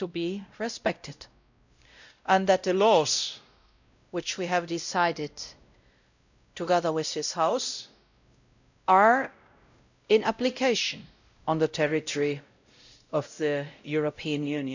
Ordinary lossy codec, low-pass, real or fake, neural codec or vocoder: none; 7.2 kHz; fake; codec, 16 kHz, 0.5 kbps, X-Codec, WavLM features, trained on Multilingual LibriSpeech